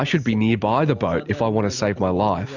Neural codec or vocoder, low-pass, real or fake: none; 7.2 kHz; real